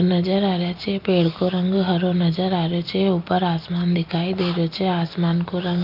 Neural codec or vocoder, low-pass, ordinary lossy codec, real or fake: none; 5.4 kHz; Opus, 24 kbps; real